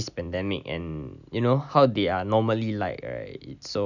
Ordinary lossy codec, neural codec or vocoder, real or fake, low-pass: none; none; real; 7.2 kHz